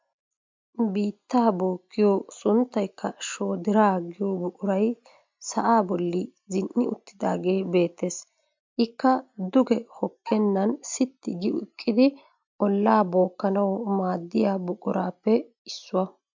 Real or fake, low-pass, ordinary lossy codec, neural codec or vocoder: real; 7.2 kHz; MP3, 64 kbps; none